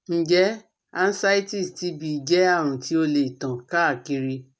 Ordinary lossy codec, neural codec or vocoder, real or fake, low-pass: none; none; real; none